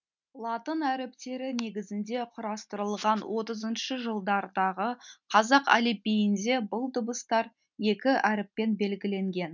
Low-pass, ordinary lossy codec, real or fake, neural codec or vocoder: 7.2 kHz; none; real; none